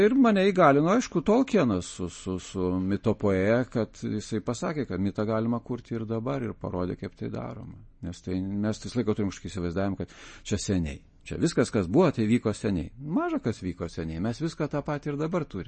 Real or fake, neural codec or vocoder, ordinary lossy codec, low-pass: real; none; MP3, 32 kbps; 10.8 kHz